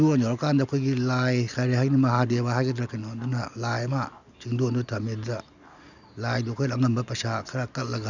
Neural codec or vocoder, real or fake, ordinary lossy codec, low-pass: none; real; none; 7.2 kHz